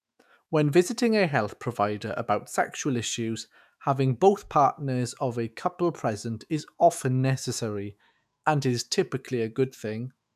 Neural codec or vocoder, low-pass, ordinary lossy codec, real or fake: autoencoder, 48 kHz, 128 numbers a frame, DAC-VAE, trained on Japanese speech; 14.4 kHz; none; fake